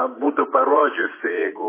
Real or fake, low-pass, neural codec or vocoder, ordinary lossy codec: fake; 3.6 kHz; vocoder, 44.1 kHz, 80 mel bands, Vocos; MP3, 16 kbps